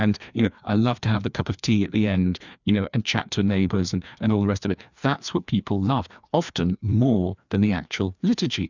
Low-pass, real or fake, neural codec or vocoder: 7.2 kHz; fake; codec, 16 kHz, 2 kbps, FreqCodec, larger model